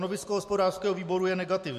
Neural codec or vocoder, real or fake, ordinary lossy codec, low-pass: none; real; MP3, 64 kbps; 14.4 kHz